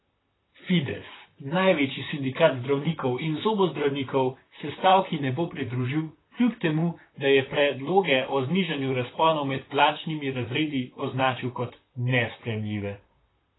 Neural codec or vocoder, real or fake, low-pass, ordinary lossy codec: vocoder, 44.1 kHz, 128 mel bands, Pupu-Vocoder; fake; 7.2 kHz; AAC, 16 kbps